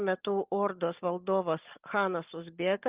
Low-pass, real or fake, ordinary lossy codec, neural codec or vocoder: 3.6 kHz; real; Opus, 24 kbps; none